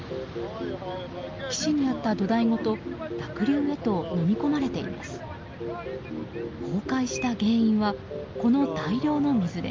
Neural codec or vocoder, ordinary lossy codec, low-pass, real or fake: none; Opus, 32 kbps; 7.2 kHz; real